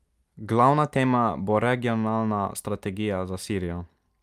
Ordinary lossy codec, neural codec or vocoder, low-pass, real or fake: Opus, 32 kbps; none; 14.4 kHz; real